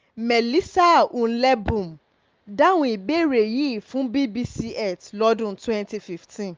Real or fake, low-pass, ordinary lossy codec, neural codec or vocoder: real; 7.2 kHz; Opus, 24 kbps; none